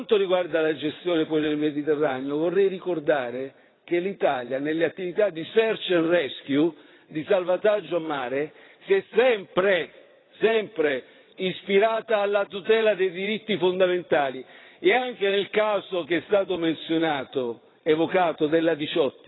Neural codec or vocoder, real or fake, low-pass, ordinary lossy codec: vocoder, 22.05 kHz, 80 mel bands, WaveNeXt; fake; 7.2 kHz; AAC, 16 kbps